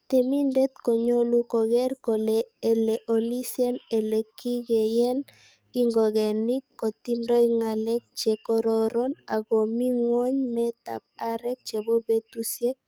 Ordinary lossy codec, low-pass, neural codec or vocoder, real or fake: none; none; codec, 44.1 kHz, 7.8 kbps, DAC; fake